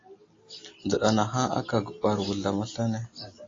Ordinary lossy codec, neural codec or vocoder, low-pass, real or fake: AAC, 32 kbps; none; 7.2 kHz; real